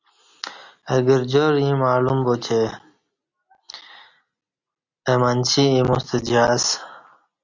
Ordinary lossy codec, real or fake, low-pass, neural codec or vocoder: Opus, 64 kbps; real; 7.2 kHz; none